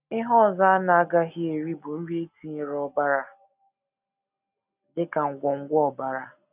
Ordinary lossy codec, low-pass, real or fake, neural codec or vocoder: none; 3.6 kHz; real; none